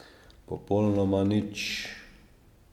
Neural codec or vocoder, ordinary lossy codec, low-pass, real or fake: none; MP3, 96 kbps; 19.8 kHz; real